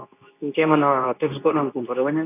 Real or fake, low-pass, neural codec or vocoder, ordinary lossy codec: fake; 3.6 kHz; codec, 24 kHz, 0.9 kbps, WavTokenizer, medium speech release version 2; AAC, 24 kbps